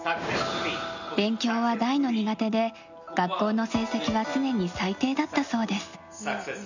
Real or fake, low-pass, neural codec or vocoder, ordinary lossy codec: real; 7.2 kHz; none; none